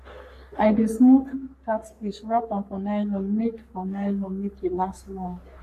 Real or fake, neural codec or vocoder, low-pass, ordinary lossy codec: fake; codec, 44.1 kHz, 3.4 kbps, Pupu-Codec; 14.4 kHz; AAC, 64 kbps